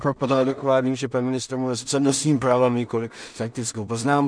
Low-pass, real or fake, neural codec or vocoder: 9.9 kHz; fake; codec, 16 kHz in and 24 kHz out, 0.4 kbps, LongCat-Audio-Codec, two codebook decoder